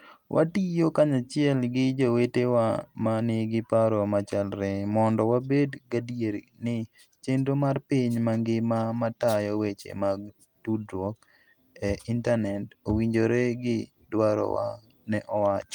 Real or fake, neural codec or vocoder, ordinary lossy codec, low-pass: real; none; Opus, 32 kbps; 19.8 kHz